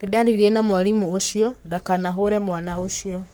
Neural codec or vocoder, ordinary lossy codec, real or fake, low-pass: codec, 44.1 kHz, 3.4 kbps, Pupu-Codec; none; fake; none